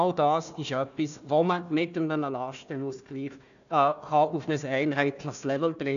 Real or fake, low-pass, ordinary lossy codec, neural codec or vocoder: fake; 7.2 kHz; none; codec, 16 kHz, 1 kbps, FunCodec, trained on Chinese and English, 50 frames a second